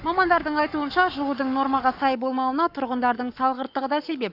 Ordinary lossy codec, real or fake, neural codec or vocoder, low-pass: Opus, 64 kbps; fake; codec, 44.1 kHz, 7.8 kbps, Pupu-Codec; 5.4 kHz